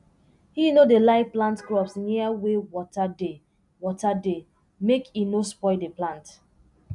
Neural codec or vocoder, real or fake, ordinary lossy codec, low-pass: none; real; none; 10.8 kHz